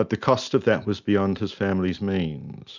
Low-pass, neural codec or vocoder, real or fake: 7.2 kHz; none; real